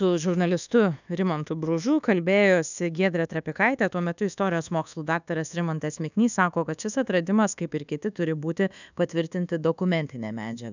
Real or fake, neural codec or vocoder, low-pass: fake; codec, 24 kHz, 1.2 kbps, DualCodec; 7.2 kHz